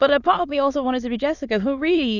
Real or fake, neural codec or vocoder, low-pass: fake; autoencoder, 22.05 kHz, a latent of 192 numbers a frame, VITS, trained on many speakers; 7.2 kHz